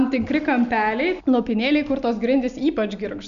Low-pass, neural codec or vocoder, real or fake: 7.2 kHz; none; real